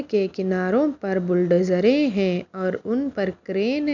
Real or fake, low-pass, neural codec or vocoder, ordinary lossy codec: real; 7.2 kHz; none; none